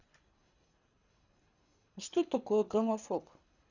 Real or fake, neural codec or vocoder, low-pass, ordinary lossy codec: fake; codec, 24 kHz, 3 kbps, HILCodec; 7.2 kHz; none